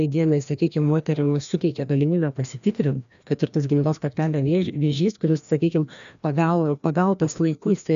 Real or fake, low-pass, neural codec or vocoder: fake; 7.2 kHz; codec, 16 kHz, 1 kbps, FreqCodec, larger model